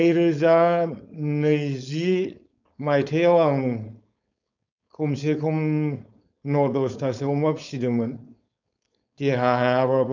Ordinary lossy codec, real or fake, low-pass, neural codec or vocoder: none; fake; 7.2 kHz; codec, 16 kHz, 4.8 kbps, FACodec